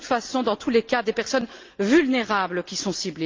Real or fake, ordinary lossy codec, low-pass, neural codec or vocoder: real; Opus, 24 kbps; 7.2 kHz; none